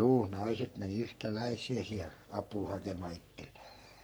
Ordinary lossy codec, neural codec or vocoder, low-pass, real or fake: none; codec, 44.1 kHz, 3.4 kbps, Pupu-Codec; none; fake